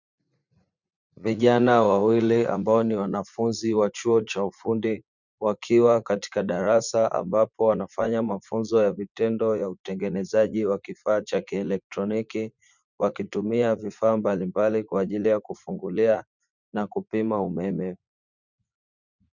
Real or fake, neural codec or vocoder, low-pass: fake; vocoder, 44.1 kHz, 80 mel bands, Vocos; 7.2 kHz